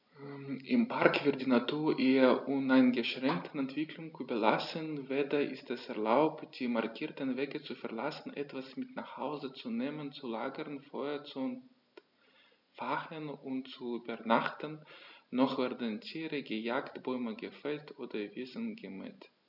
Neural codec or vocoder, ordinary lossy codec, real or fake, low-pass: none; none; real; 5.4 kHz